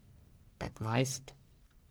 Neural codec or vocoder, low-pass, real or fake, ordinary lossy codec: codec, 44.1 kHz, 1.7 kbps, Pupu-Codec; none; fake; none